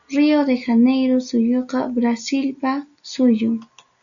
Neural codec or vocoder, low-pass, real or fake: none; 7.2 kHz; real